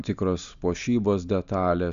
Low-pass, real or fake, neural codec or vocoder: 7.2 kHz; real; none